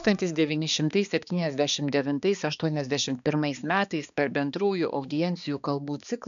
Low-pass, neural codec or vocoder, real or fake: 7.2 kHz; codec, 16 kHz, 2 kbps, X-Codec, HuBERT features, trained on balanced general audio; fake